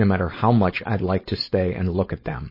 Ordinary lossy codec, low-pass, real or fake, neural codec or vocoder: MP3, 24 kbps; 5.4 kHz; fake; codec, 16 kHz, 4.8 kbps, FACodec